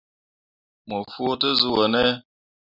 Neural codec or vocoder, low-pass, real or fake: none; 5.4 kHz; real